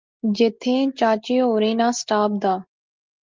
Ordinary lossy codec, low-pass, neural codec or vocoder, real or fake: Opus, 32 kbps; 7.2 kHz; none; real